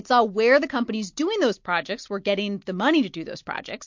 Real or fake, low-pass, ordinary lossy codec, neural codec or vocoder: real; 7.2 kHz; MP3, 48 kbps; none